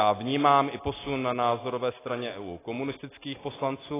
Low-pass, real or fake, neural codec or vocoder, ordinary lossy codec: 3.6 kHz; real; none; AAC, 16 kbps